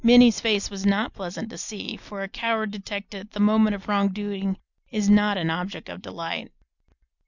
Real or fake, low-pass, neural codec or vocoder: real; 7.2 kHz; none